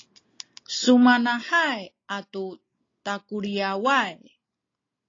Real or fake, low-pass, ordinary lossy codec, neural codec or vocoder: real; 7.2 kHz; AAC, 32 kbps; none